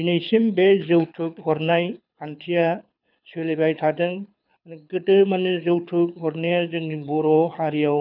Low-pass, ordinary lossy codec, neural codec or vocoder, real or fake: 5.4 kHz; none; codec, 24 kHz, 6 kbps, HILCodec; fake